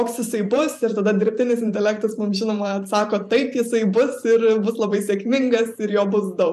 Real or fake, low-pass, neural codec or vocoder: fake; 14.4 kHz; vocoder, 44.1 kHz, 128 mel bands every 512 samples, BigVGAN v2